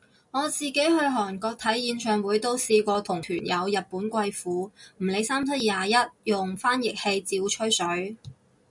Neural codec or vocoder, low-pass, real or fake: none; 10.8 kHz; real